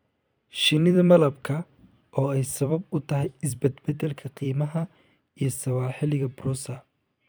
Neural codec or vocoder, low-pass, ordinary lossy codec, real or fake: vocoder, 44.1 kHz, 128 mel bands every 256 samples, BigVGAN v2; none; none; fake